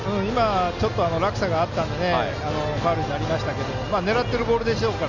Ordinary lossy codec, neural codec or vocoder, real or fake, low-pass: none; none; real; 7.2 kHz